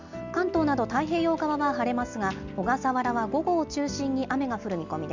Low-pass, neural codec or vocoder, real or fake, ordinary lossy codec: 7.2 kHz; none; real; Opus, 64 kbps